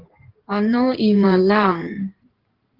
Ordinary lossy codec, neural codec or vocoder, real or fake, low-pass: Opus, 16 kbps; codec, 16 kHz in and 24 kHz out, 2.2 kbps, FireRedTTS-2 codec; fake; 5.4 kHz